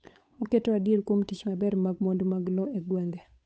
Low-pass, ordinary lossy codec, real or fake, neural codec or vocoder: none; none; fake; codec, 16 kHz, 8 kbps, FunCodec, trained on Chinese and English, 25 frames a second